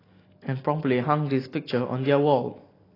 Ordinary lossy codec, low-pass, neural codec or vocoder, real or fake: AAC, 24 kbps; 5.4 kHz; none; real